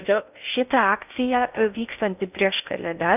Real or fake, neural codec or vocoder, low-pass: fake; codec, 16 kHz in and 24 kHz out, 0.6 kbps, FocalCodec, streaming, 2048 codes; 3.6 kHz